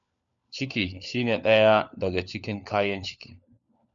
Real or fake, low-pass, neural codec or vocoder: fake; 7.2 kHz; codec, 16 kHz, 4 kbps, FunCodec, trained on LibriTTS, 50 frames a second